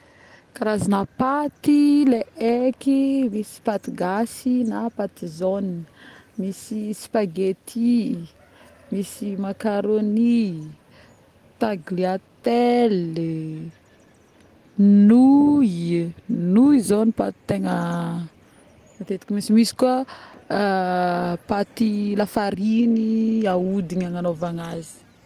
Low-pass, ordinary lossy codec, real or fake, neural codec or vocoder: 14.4 kHz; Opus, 24 kbps; real; none